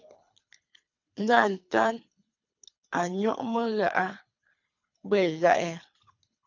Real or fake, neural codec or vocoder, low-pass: fake; codec, 24 kHz, 3 kbps, HILCodec; 7.2 kHz